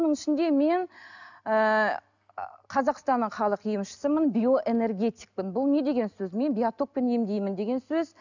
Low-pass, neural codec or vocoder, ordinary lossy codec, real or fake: 7.2 kHz; none; none; real